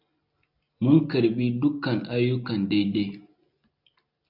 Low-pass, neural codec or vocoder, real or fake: 5.4 kHz; none; real